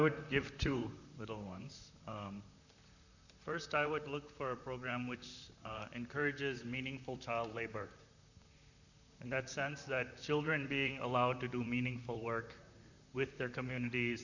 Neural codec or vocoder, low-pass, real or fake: vocoder, 44.1 kHz, 128 mel bands, Pupu-Vocoder; 7.2 kHz; fake